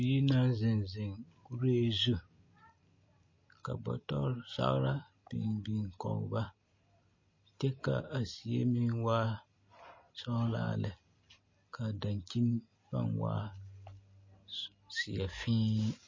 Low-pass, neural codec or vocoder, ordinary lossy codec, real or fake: 7.2 kHz; none; MP3, 32 kbps; real